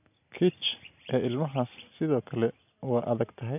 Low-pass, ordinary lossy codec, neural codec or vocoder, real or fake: 3.6 kHz; none; none; real